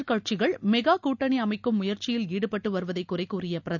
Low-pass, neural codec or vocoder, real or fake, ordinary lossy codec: 7.2 kHz; none; real; none